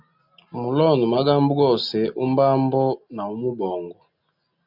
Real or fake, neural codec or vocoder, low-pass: real; none; 5.4 kHz